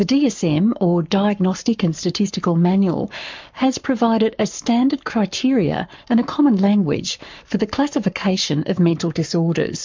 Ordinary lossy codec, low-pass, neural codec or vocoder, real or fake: MP3, 64 kbps; 7.2 kHz; codec, 44.1 kHz, 7.8 kbps, Pupu-Codec; fake